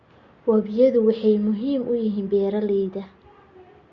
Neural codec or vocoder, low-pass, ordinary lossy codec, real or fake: none; 7.2 kHz; Opus, 64 kbps; real